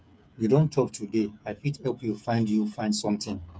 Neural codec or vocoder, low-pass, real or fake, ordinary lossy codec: codec, 16 kHz, 8 kbps, FreqCodec, smaller model; none; fake; none